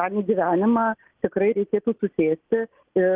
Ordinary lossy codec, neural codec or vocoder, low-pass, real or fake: Opus, 16 kbps; none; 3.6 kHz; real